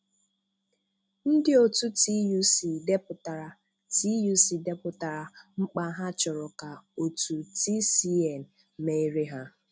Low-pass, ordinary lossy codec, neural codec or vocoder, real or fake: none; none; none; real